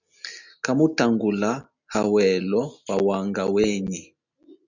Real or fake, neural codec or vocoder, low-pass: real; none; 7.2 kHz